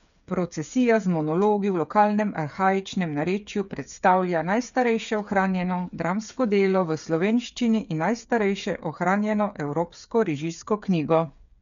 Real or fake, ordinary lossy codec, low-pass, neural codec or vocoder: fake; none; 7.2 kHz; codec, 16 kHz, 8 kbps, FreqCodec, smaller model